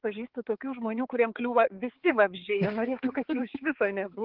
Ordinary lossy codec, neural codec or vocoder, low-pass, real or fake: Opus, 16 kbps; codec, 16 kHz, 4 kbps, X-Codec, HuBERT features, trained on balanced general audio; 5.4 kHz; fake